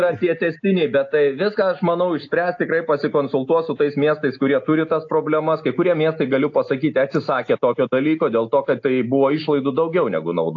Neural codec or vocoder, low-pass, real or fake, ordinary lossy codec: none; 7.2 kHz; real; AAC, 48 kbps